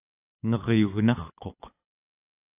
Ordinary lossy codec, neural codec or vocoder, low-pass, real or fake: AAC, 24 kbps; codec, 24 kHz, 6 kbps, HILCodec; 3.6 kHz; fake